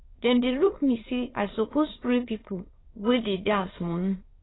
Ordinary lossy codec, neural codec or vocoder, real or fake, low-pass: AAC, 16 kbps; autoencoder, 22.05 kHz, a latent of 192 numbers a frame, VITS, trained on many speakers; fake; 7.2 kHz